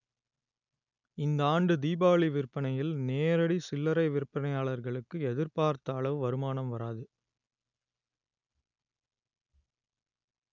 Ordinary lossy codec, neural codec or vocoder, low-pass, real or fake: none; none; 7.2 kHz; real